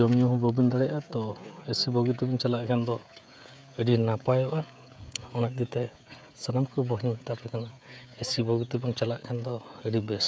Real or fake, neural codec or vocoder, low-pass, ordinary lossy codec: fake; codec, 16 kHz, 16 kbps, FreqCodec, smaller model; none; none